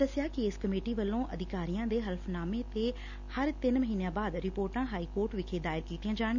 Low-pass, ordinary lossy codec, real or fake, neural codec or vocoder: 7.2 kHz; none; real; none